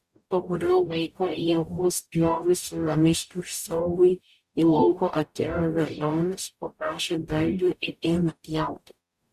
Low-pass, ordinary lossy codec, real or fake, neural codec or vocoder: 14.4 kHz; Opus, 64 kbps; fake; codec, 44.1 kHz, 0.9 kbps, DAC